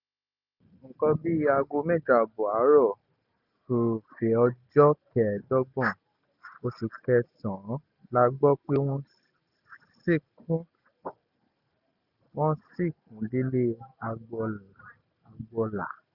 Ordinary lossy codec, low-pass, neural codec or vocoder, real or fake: none; 5.4 kHz; none; real